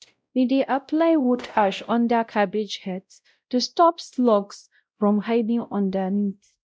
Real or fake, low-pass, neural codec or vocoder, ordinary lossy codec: fake; none; codec, 16 kHz, 0.5 kbps, X-Codec, WavLM features, trained on Multilingual LibriSpeech; none